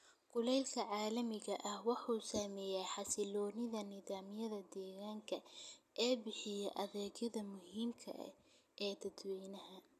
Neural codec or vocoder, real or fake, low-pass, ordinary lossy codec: none; real; 14.4 kHz; none